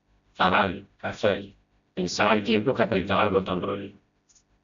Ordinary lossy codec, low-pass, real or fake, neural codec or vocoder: AAC, 64 kbps; 7.2 kHz; fake; codec, 16 kHz, 0.5 kbps, FreqCodec, smaller model